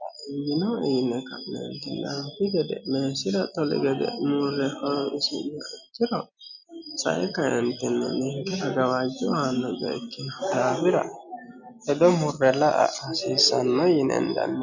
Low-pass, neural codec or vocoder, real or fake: 7.2 kHz; none; real